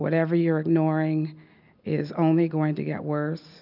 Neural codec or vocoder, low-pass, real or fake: none; 5.4 kHz; real